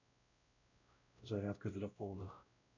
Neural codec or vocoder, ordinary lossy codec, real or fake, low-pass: codec, 16 kHz, 0.5 kbps, X-Codec, WavLM features, trained on Multilingual LibriSpeech; none; fake; 7.2 kHz